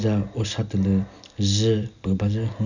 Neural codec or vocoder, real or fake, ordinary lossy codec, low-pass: none; real; none; 7.2 kHz